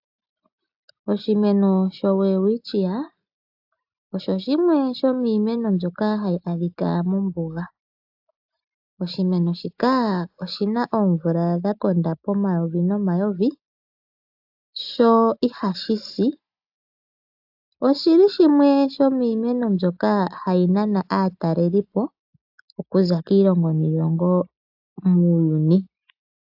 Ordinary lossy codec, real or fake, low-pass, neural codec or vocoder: AAC, 48 kbps; real; 5.4 kHz; none